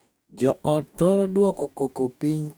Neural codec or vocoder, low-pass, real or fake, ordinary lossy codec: codec, 44.1 kHz, 2.6 kbps, DAC; none; fake; none